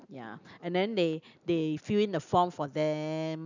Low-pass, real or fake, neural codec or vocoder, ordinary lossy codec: 7.2 kHz; real; none; none